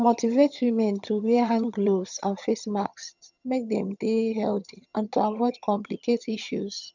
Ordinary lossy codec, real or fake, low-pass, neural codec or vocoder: none; fake; 7.2 kHz; vocoder, 22.05 kHz, 80 mel bands, HiFi-GAN